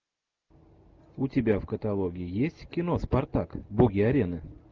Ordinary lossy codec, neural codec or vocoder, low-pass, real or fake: Opus, 32 kbps; none; 7.2 kHz; real